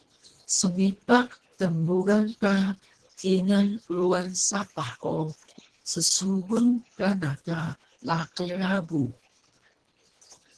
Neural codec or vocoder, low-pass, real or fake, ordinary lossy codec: codec, 24 kHz, 1.5 kbps, HILCodec; 10.8 kHz; fake; Opus, 16 kbps